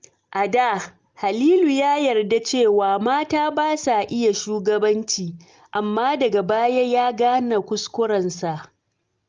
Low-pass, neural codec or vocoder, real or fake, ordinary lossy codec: 7.2 kHz; none; real; Opus, 24 kbps